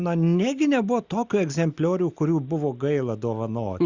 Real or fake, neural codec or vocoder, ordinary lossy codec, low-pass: real; none; Opus, 64 kbps; 7.2 kHz